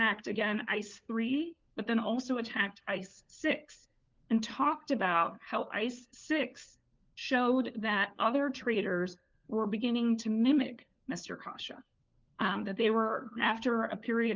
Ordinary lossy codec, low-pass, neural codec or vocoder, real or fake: Opus, 24 kbps; 7.2 kHz; codec, 16 kHz, 4 kbps, FunCodec, trained on LibriTTS, 50 frames a second; fake